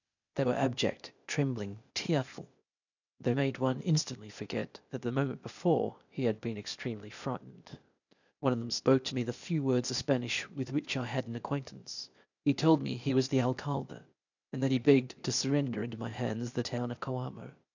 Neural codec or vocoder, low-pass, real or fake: codec, 16 kHz, 0.8 kbps, ZipCodec; 7.2 kHz; fake